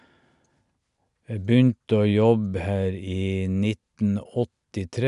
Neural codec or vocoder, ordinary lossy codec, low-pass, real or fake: none; none; 10.8 kHz; real